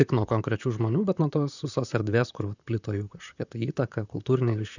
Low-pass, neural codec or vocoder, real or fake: 7.2 kHz; vocoder, 44.1 kHz, 128 mel bands, Pupu-Vocoder; fake